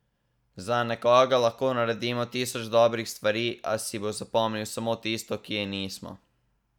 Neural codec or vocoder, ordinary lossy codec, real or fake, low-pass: none; none; real; 19.8 kHz